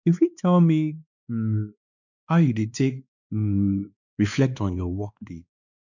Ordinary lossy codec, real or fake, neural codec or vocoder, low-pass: none; fake; codec, 16 kHz, 2 kbps, X-Codec, WavLM features, trained on Multilingual LibriSpeech; 7.2 kHz